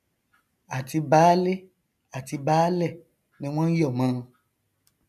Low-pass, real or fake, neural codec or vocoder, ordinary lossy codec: 14.4 kHz; real; none; none